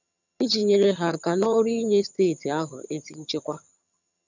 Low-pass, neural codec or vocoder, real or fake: 7.2 kHz; vocoder, 22.05 kHz, 80 mel bands, HiFi-GAN; fake